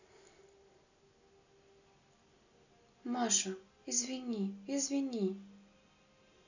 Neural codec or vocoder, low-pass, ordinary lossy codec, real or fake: none; 7.2 kHz; none; real